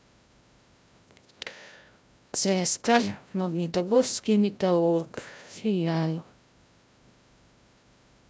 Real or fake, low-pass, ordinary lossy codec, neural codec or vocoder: fake; none; none; codec, 16 kHz, 0.5 kbps, FreqCodec, larger model